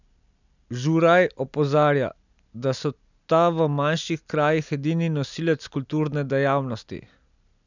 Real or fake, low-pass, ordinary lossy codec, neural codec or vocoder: real; 7.2 kHz; none; none